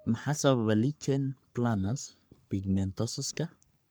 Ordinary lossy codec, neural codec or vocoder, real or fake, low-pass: none; codec, 44.1 kHz, 3.4 kbps, Pupu-Codec; fake; none